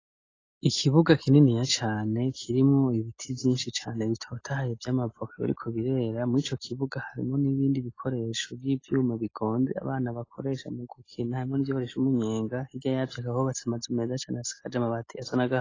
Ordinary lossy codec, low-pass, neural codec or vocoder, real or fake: AAC, 32 kbps; 7.2 kHz; none; real